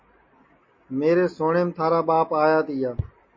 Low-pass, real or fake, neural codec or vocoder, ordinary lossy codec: 7.2 kHz; real; none; MP3, 32 kbps